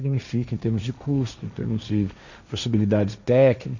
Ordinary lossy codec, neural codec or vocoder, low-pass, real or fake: none; codec, 16 kHz, 1.1 kbps, Voila-Tokenizer; 7.2 kHz; fake